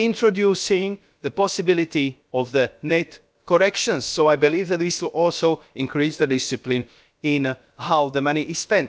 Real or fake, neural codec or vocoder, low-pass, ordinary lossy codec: fake; codec, 16 kHz, about 1 kbps, DyCAST, with the encoder's durations; none; none